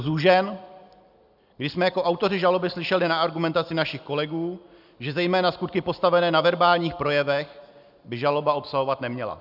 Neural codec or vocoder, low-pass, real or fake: none; 5.4 kHz; real